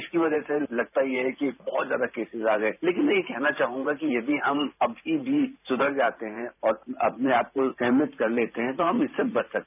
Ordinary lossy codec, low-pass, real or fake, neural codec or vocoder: none; 3.6 kHz; fake; vocoder, 44.1 kHz, 128 mel bands every 512 samples, BigVGAN v2